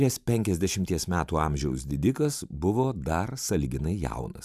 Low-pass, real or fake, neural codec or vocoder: 14.4 kHz; fake; vocoder, 44.1 kHz, 128 mel bands every 512 samples, BigVGAN v2